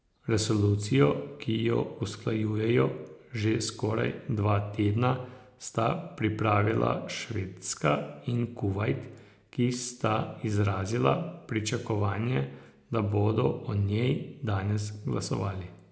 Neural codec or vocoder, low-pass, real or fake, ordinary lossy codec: none; none; real; none